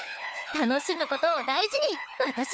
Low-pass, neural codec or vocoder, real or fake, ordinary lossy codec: none; codec, 16 kHz, 4 kbps, FunCodec, trained on LibriTTS, 50 frames a second; fake; none